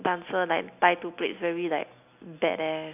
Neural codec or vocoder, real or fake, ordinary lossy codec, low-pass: none; real; none; 3.6 kHz